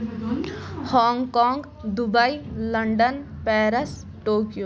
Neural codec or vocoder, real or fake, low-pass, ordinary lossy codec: none; real; none; none